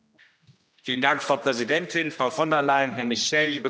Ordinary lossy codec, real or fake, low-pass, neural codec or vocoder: none; fake; none; codec, 16 kHz, 1 kbps, X-Codec, HuBERT features, trained on general audio